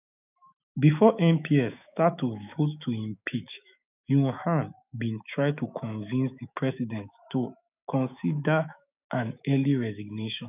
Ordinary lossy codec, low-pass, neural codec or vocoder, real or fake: none; 3.6 kHz; autoencoder, 48 kHz, 128 numbers a frame, DAC-VAE, trained on Japanese speech; fake